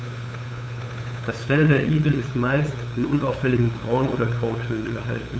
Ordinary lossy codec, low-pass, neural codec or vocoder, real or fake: none; none; codec, 16 kHz, 8 kbps, FunCodec, trained on LibriTTS, 25 frames a second; fake